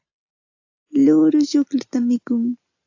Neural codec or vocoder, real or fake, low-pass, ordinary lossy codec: none; real; 7.2 kHz; AAC, 48 kbps